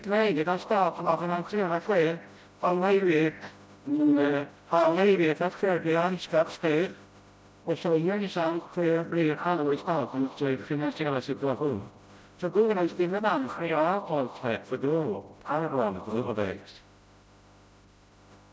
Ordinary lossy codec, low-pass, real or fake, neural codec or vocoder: none; none; fake; codec, 16 kHz, 0.5 kbps, FreqCodec, smaller model